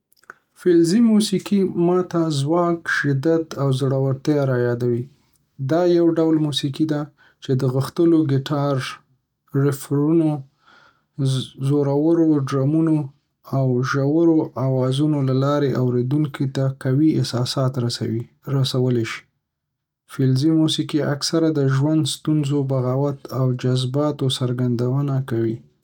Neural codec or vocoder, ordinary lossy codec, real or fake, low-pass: none; none; real; 19.8 kHz